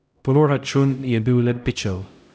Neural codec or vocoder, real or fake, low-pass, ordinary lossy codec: codec, 16 kHz, 0.5 kbps, X-Codec, HuBERT features, trained on LibriSpeech; fake; none; none